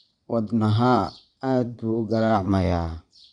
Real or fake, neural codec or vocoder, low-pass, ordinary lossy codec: fake; vocoder, 22.05 kHz, 80 mel bands, Vocos; 9.9 kHz; Opus, 64 kbps